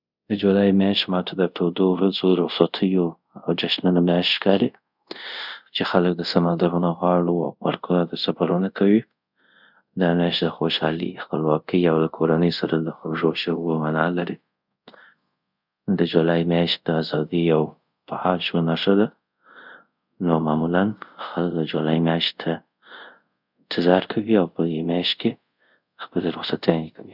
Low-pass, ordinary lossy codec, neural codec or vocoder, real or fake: 5.4 kHz; none; codec, 24 kHz, 0.5 kbps, DualCodec; fake